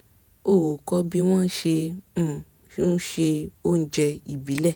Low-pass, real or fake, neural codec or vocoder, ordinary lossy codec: none; fake; vocoder, 48 kHz, 128 mel bands, Vocos; none